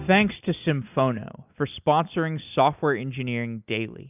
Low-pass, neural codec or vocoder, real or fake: 3.6 kHz; none; real